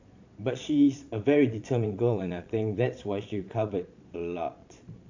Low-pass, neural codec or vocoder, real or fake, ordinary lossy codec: 7.2 kHz; vocoder, 22.05 kHz, 80 mel bands, Vocos; fake; AAC, 48 kbps